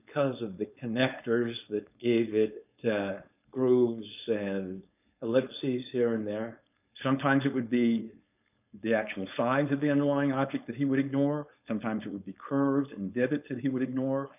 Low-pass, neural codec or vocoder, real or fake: 3.6 kHz; codec, 16 kHz, 4.8 kbps, FACodec; fake